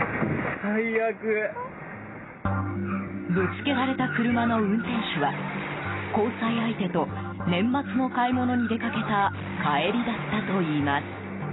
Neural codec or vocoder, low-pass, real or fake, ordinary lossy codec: none; 7.2 kHz; real; AAC, 16 kbps